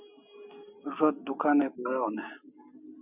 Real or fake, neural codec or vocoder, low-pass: real; none; 3.6 kHz